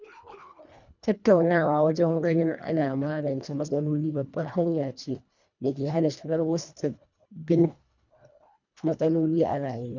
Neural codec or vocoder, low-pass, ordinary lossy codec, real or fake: codec, 24 kHz, 1.5 kbps, HILCodec; 7.2 kHz; none; fake